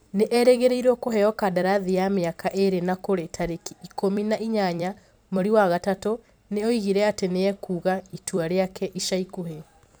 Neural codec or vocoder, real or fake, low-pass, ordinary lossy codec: vocoder, 44.1 kHz, 128 mel bands every 256 samples, BigVGAN v2; fake; none; none